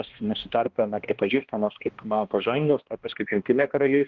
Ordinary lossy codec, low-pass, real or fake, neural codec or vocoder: Opus, 16 kbps; 7.2 kHz; fake; codec, 16 kHz, 1 kbps, X-Codec, HuBERT features, trained on balanced general audio